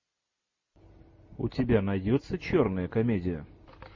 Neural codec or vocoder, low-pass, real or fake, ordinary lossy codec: none; 7.2 kHz; real; MP3, 32 kbps